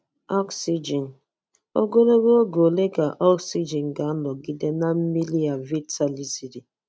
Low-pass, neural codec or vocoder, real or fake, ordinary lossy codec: none; none; real; none